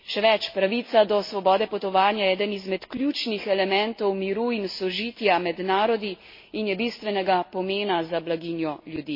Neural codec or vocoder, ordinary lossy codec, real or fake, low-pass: none; MP3, 24 kbps; real; 5.4 kHz